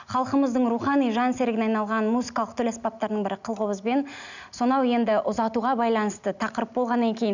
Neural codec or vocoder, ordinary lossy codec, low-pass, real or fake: none; none; 7.2 kHz; real